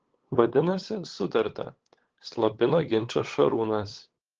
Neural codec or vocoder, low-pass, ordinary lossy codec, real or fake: codec, 16 kHz, 8 kbps, FunCodec, trained on LibriTTS, 25 frames a second; 7.2 kHz; Opus, 16 kbps; fake